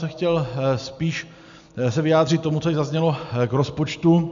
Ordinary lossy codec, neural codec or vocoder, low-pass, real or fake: MP3, 64 kbps; none; 7.2 kHz; real